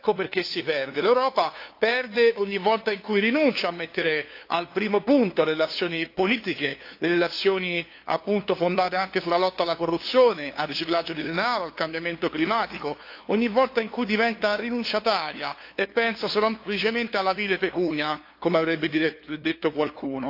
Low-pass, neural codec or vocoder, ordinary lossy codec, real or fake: 5.4 kHz; codec, 16 kHz, 2 kbps, FunCodec, trained on LibriTTS, 25 frames a second; AAC, 32 kbps; fake